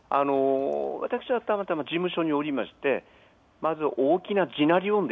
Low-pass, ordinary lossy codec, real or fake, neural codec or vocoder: none; none; real; none